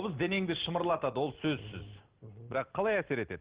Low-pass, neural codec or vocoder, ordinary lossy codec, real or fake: 3.6 kHz; none; Opus, 16 kbps; real